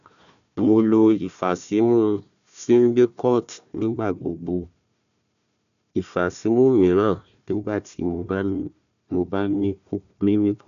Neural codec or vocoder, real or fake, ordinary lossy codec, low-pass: codec, 16 kHz, 1 kbps, FunCodec, trained on Chinese and English, 50 frames a second; fake; none; 7.2 kHz